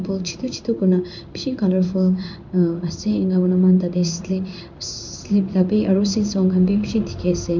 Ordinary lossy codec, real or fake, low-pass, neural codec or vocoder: none; fake; 7.2 kHz; codec, 16 kHz in and 24 kHz out, 1 kbps, XY-Tokenizer